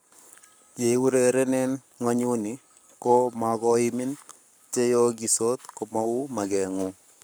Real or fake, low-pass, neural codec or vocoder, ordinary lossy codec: fake; none; codec, 44.1 kHz, 7.8 kbps, Pupu-Codec; none